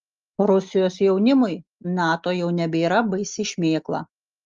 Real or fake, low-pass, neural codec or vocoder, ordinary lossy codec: real; 7.2 kHz; none; Opus, 32 kbps